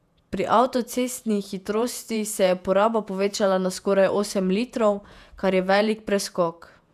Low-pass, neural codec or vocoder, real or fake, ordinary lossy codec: 14.4 kHz; vocoder, 48 kHz, 128 mel bands, Vocos; fake; none